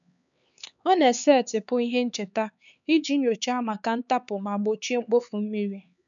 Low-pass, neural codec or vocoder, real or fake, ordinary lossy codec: 7.2 kHz; codec, 16 kHz, 4 kbps, X-Codec, HuBERT features, trained on LibriSpeech; fake; none